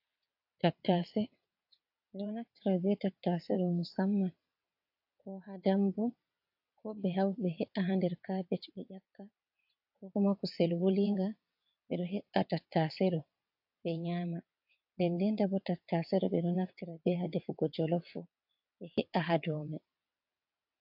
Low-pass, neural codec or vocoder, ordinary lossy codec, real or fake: 5.4 kHz; vocoder, 22.05 kHz, 80 mel bands, WaveNeXt; AAC, 48 kbps; fake